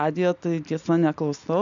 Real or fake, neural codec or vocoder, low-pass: real; none; 7.2 kHz